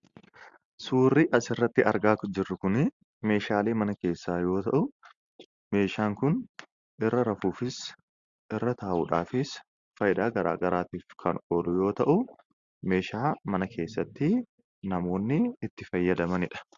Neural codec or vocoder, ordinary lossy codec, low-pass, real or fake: none; Opus, 64 kbps; 7.2 kHz; real